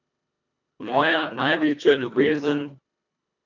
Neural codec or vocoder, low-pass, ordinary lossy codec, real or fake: codec, 24 kHz, 1.5 kbps, HILCodec; 7.2 kHz; none; fake